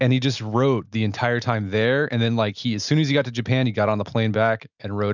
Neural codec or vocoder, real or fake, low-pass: none; real; 7.2 kHz